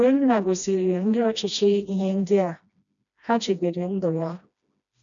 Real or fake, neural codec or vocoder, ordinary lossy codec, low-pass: fake; codec, 16 kHz, 1 kbps, FreqCodec, smaller model; none; 7.2 kHz